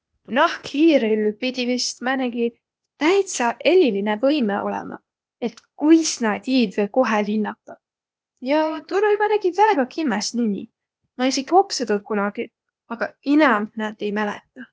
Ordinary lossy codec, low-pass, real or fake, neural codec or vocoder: none; none; fake; codec, 16 kHz, 0.8 kbps, ZipCodec